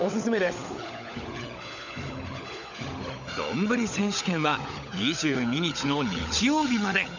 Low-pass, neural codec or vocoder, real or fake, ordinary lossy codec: 7.2 kHz; codec, 16 kHz, 16 kbps, FunCodec, trained on LibriTTS, 50 frames a second; fake; none